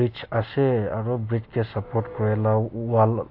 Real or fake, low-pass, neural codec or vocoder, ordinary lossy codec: real; 5.4 kHz; none; AAC, 32 kbps